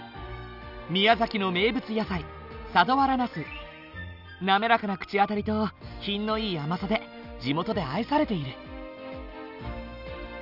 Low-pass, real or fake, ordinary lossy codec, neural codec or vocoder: 5.4 kHz; real; none; none